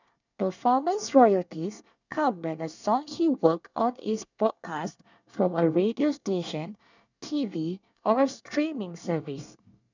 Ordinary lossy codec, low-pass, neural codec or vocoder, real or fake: none; 7.2 kHz; codec, 24 kHz, 1 kbps, SNAC; fake